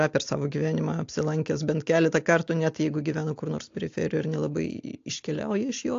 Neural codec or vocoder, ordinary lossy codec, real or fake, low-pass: none; AAC, 48 kbps; real; 7.2 kHz